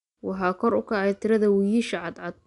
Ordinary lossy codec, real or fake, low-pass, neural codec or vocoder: none; real; 10.8 kHz; none